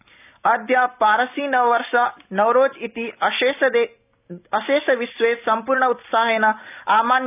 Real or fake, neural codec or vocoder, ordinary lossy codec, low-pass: real; none; none; 3.6 kHz